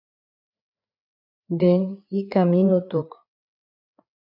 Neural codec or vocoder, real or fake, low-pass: codec, 16 kHz, 4 kbps, FreqCodec, larger model; fake; 5.4 kHz